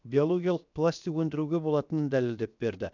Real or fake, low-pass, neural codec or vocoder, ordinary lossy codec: fake; 7.2 kHz; codec, 16 kHz, 0.7 kbps, FocalCodec; none